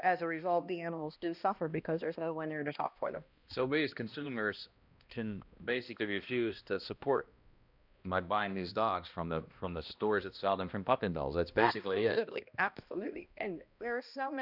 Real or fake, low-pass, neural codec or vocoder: fake; 5.4 kHz; codec, 16 kHz, 1 kbps, X-Codec, HuBERT features, trained on balanced general audio